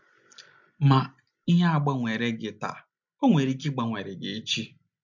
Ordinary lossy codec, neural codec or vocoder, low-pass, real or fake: MP3, 64 kbps; none; 7.2 kHz; real